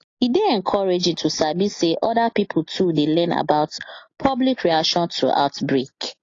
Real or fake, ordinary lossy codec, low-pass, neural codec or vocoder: real; AAC, 32 kbps; 7.2 kHz; none